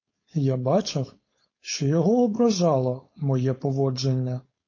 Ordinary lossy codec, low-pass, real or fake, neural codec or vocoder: MP3, 32 kbps; 7.2 kHz; fake; codec, 16 kHz, 4.8 kbps, FACodec